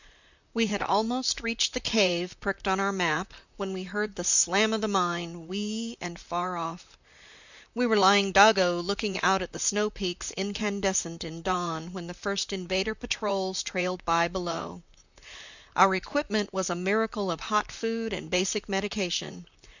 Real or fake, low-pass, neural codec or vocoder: fake; 7.2 kHz; vocoder, 44.1 kHz, 128 mel bands, Pupu-Vocoder